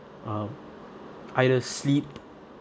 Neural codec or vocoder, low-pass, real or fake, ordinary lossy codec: none; none; real; none